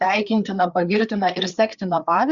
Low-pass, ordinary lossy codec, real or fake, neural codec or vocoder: 7.2 kHz; Opus, 64 kbps; fake; codec, 16 kHz, 4 kbps, FreqCodec, larger model